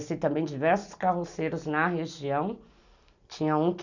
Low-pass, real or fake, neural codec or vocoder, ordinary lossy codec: 7.2 kHz; real; none; none